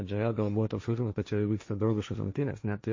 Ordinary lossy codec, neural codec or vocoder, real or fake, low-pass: MP3, 32 kbps; codec, 16 kHz, 1.1 kbps, Voila-Tokenizer; fake; 7.2 kHz